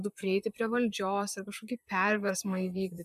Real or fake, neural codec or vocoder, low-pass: fake; vocoder, 44.1 kHz, 128 mel bands, Pupu-Vocoder; 14.4 kHz